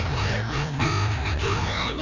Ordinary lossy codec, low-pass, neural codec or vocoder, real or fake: none; 7.2 kHz; codec, 16 kHz, 1 kbps, FreqCodec, larger model; fake